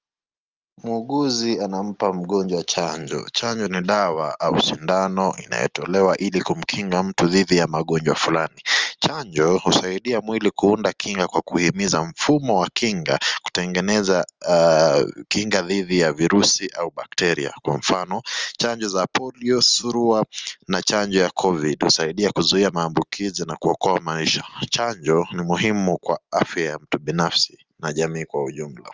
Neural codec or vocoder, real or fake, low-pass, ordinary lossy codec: none; real; 7.2 kHz; Opus, 32 kbps